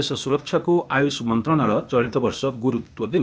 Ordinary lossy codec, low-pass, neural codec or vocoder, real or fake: none; none; codec, 16 kHz, 0.8 kbps, ZipCodec; fake